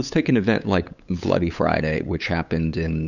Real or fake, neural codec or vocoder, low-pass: fake; codec, 16 kHz, 8 kbps, FunCodec, trained on LibriTTS, 25 frames a second; 7.2 kHz